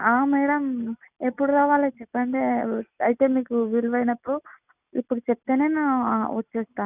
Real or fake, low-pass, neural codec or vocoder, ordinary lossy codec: real; 3.6 kHz; none; none